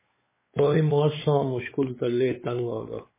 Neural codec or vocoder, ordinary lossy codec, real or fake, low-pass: codec, 16 kHz, 4 kbps, X-Codec, WavLM features, trained on Multilingual LibriSpeech; MP3, 16 kbps; fake; 3.6 kHz